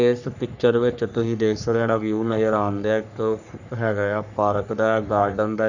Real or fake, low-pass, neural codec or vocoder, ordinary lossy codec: fake; 7.2 kHz; codec, 44.1 kHz, 3.4 kbps, Pupu-Codec; none